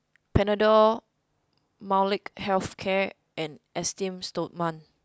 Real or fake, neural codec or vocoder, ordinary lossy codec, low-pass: real; none; none; none